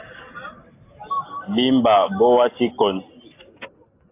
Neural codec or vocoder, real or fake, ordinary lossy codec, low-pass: none; real; AAC, 24 kbps; 3.6 kHz